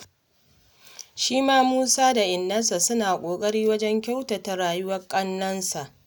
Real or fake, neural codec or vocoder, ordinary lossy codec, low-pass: real; none; none; none